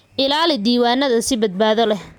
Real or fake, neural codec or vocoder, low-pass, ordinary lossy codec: real; none; 19.8 kHz; none